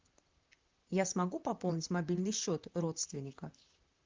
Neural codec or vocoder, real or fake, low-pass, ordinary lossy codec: vocoder, 44.1 kHz, 128 mel bands, Pupu-Vocoder; fake; 7.2 kHz; Opus, 24 kbps